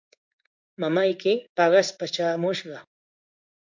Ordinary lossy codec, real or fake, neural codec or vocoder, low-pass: MP3, 64 kbps; fake; codec, 16 kHz in and 24 kHz out, 1 kbps, XY-Tokenizer; 7.2 kHz